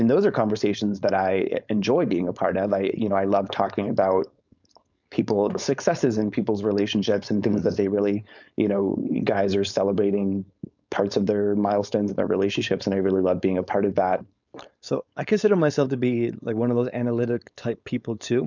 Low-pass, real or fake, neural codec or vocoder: 7.2 kHz; fake; codec, 16 kHz, 4.8 kbps, FACodec